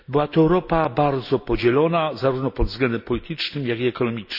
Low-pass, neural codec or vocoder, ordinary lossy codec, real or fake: 5.4 kHz; none; none; real